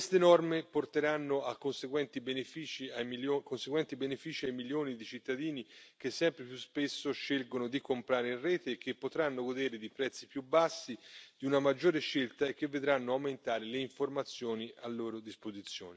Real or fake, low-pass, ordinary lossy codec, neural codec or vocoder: real; none; none; none